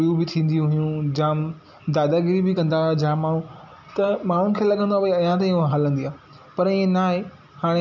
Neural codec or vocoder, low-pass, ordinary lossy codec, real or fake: none; 7.2 kHz; none; real